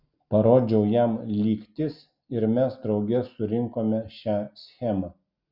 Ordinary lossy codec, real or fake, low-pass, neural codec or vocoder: Opus, 64 kbps; real; 5.4 kHz; none